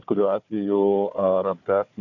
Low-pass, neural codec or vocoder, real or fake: 7.2 kHz; codec, 16 kHz, 4 kbps, FunCodec, trained on Chinese and English, 50 frames a second; fake